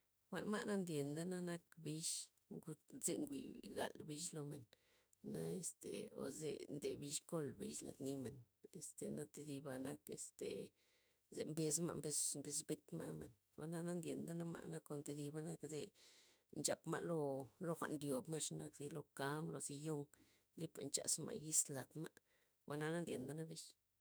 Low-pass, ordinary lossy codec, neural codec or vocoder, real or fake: none; none; autoencoder, 48 kHz, 32 numbers a frame, DAC-VAE, trained on Japanese speech; fake